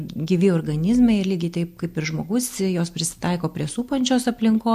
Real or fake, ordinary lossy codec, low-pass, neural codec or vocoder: real; AAC, 96 kbps; 14.4 kHz; none